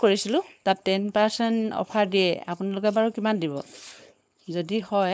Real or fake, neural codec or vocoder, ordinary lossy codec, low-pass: fake; codec, 16 kHz, 4.8 kbps, FACodec; none; none